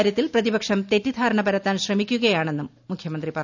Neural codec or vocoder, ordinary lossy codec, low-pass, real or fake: none; none; 7.2 kHz; real